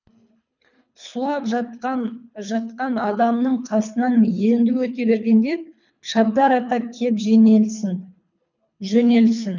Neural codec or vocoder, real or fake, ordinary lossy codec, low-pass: codec, 24 kHz, 3 kbps, HILCodec; fake; none; 7.2 kHz